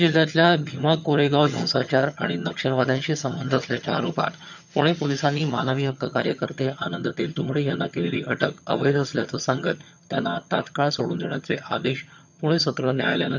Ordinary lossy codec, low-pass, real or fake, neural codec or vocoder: none; 7.2 kHz; fake; vocoder, 22.05 kHz, 80 mel bands, HiFi-GAN